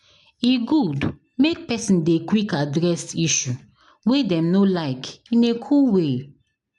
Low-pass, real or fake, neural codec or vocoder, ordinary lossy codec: 10.8 kHz; real; none; none